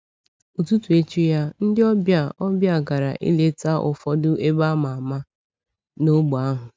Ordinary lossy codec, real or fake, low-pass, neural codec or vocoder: none; real; none; none